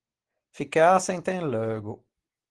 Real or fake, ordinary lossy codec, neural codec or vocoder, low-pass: real; Opus, 16 kbps; none; 10.8 kHz